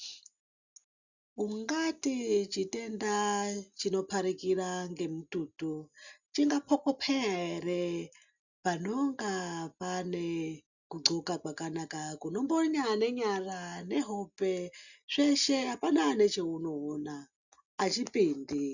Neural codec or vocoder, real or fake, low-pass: none; real; 7.2 kHz